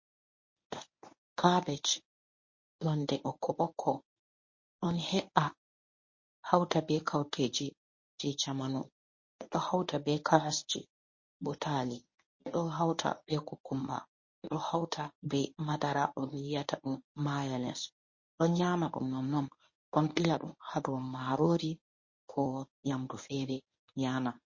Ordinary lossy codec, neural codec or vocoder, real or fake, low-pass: MP3, 32 kbps; codec, 24 kHz, 0.9 kbps, WavTokenizer, medium speech release version 1; fake; 7.2 kHz